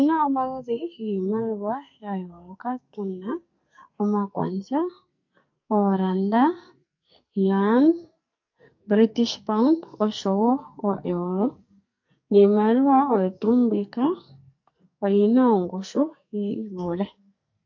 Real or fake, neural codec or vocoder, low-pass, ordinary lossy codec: fake; codec, 44.1 kHz, 2.6 kbps, SNAC; 7.2 kHz; MP3, 48 kbps